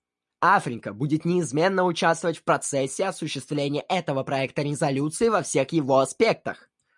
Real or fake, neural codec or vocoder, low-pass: real; none; 10.8 kHz